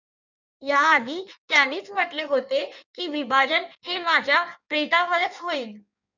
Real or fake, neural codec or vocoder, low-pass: fake; codec, 16 kHz in and 24 kHz out, 1.1 kbps, FireRedTTS-2 codec; 7.2 kHz